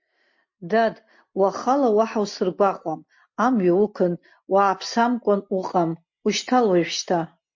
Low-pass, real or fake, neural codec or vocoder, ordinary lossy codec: 7.2 kHz; real; none; MP3, 48 kbps